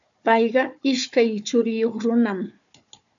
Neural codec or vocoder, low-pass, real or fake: codec, 16 kHz, 4 kbps, FunCodec, trained on Chinese and English, 50 frames a second; 7.2 kHz; fake